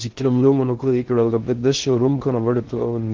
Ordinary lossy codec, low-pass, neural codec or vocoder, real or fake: Opus, 16 kbps; 7.2 kHz; codec, 16 kHz in and 24 kHz out, 0.6 kbps, FocalCodec, streaming, 2048 codes; fake